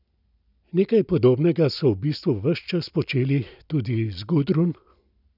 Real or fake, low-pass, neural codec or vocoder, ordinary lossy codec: real; 5.4 kHz; none; none